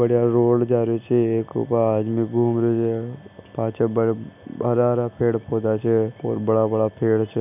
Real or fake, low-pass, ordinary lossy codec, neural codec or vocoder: real; 3.6 kHz; none; none